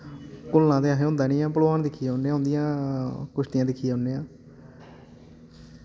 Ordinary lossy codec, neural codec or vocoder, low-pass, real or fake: none; none; none; real